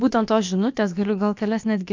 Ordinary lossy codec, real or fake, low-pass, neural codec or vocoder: MP3, 64 kbps; fake; 7.2 kHz; codec, 16 kHz, about 1 kbps, DyCAST, with the encoder's durations